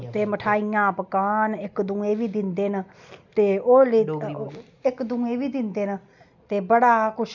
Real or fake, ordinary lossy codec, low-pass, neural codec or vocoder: real; none; 7.2 kHz; none